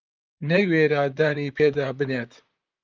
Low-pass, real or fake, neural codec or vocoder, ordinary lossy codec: 7.2 kHz; fake; vocoder, 44.1 kHz, 128 mel bands, Pupu-Vocoder; Opus, 24 kbps